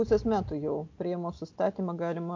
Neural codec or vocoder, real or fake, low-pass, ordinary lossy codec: none; real; 7.2 kHz; MP3, 64 kbps